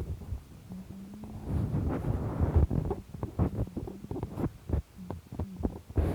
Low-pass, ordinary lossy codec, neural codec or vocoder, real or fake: 19.8 kHz; Opus, 64 kbps; none; real